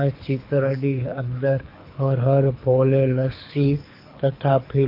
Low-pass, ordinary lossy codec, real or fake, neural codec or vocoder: 5.4 kHz; AAC, 32 kbps; fake; codec, 24 kHz, 6 kbps, HILCodec